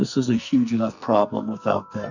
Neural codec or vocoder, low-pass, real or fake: codec, 44.1 kHz, 2.6 kbps, SNAC; 7.2 kHz; fake